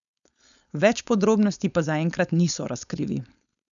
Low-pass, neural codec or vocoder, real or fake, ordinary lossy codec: 7.2 kHz; codec, 16 kHz, 4.8 kbps, FACodec; fake; none